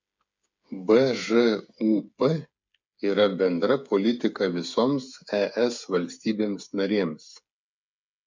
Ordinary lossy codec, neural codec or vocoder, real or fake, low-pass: MP3, 64 kbps; codec, 16 kHz, 8 kbps, FreqCodec, smaller model; fake; 7.2 kHz